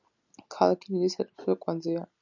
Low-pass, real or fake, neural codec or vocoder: 7.2 kHz; real; none